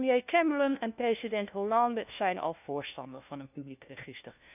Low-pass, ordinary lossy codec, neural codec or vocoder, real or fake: 3.6 kHz; none; codec, 16 kHz, 1 kbps, FunCodec, trained on LibriTTS, 50 frames a second; fake